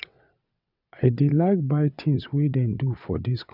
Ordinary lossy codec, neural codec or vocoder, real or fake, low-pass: none; vocoder, 22.05 kHz, 80 mel bands, WaveNeXt; fake; 5.4 kHz